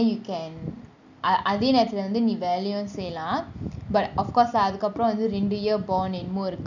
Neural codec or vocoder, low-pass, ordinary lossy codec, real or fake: none; 7.2 kHz; none; real